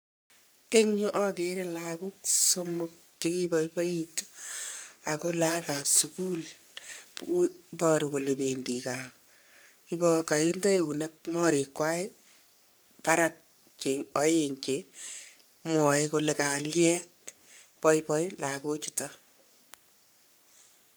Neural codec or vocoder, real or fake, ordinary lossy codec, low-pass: codec, 44.1 kHz, 3.4 kbps, Pupu-Codec; fake; none; none